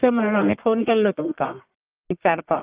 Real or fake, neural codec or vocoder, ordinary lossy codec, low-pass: fake; codec, 44.1 kHz, 1.7 kbps, Pupu-Codec; Opus, 24 kbps; 3.6 kHz